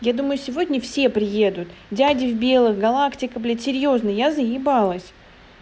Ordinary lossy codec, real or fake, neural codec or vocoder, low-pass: none; real; none; none